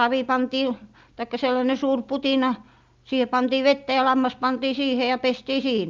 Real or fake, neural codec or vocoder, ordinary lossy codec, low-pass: real; none; Opus, 24 kbps; 7.2 kHz